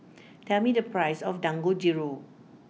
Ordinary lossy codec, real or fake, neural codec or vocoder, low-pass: none; real; none; none